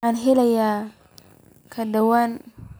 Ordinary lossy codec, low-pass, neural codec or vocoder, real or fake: none; none; none; real